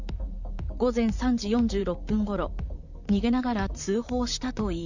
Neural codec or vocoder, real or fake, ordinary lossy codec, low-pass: vocoder, 44.1 kHz, 128 mel bands, Pupu-Vocoder; fake; none; 7.2 kHz